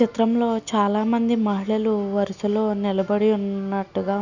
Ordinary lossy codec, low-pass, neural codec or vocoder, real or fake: none; 7.2 kHz; none; real